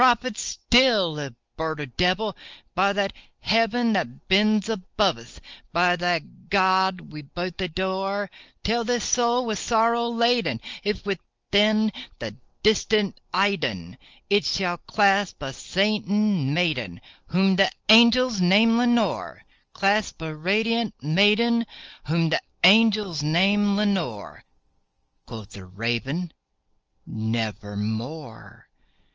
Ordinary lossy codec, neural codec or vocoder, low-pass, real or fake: Opus, 24 kbps; none; 7.2 kHz; real